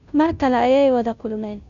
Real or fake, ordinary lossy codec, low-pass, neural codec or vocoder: fake; none; 7.2 kHz; codec, 16 kHz, 0.5 kbps, FunCodec, trained on Chinese and English, 25 frames a second